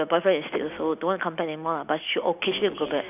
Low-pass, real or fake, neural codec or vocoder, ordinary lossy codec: 3.6 kHz; real; none; none